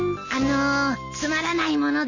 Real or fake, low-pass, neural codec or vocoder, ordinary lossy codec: real; 7.2 kHz; none; none